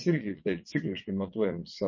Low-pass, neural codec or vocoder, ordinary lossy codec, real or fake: 7.2 kHz; vocoder, 22.05 kHz, 80 mel bands, WaveNeXt; MP3, 32 kbps; fake